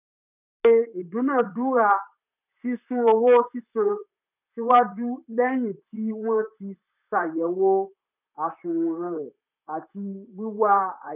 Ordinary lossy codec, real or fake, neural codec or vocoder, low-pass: none; fake; vocoder, 44.1 kHz, 128 mel bands, Pupu-Vocoder; 3.6 kHz